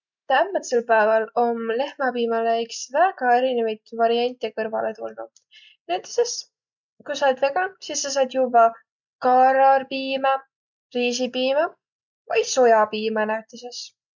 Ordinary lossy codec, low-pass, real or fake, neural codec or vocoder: none; 7.2 kHz; real; none